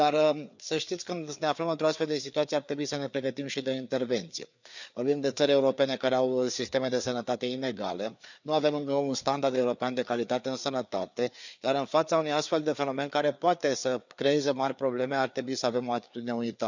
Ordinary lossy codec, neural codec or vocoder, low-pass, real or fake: none; codec, 16 kHz, 4 kbps, FreqCodec, larger model; 7.2 kHz; fake